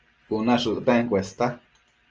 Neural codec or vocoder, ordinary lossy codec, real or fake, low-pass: none; Opus, 24 kbps; real; 7.2 kHz